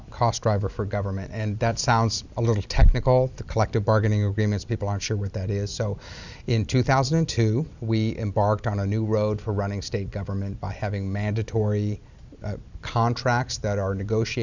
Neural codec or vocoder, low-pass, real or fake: none; 7.2 kHz; real